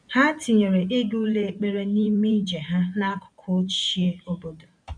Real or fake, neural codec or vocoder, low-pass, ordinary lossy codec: fake; vocoder, 44.1 kHz, 128 mel bands every 512 samples, BigVGAN v2; 9.9 kHz; none